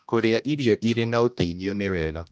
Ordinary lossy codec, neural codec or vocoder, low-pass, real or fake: none; codec, 16 kHz, 1 kbps, X-Codec, HuBERT features, trained on general audio; none; fake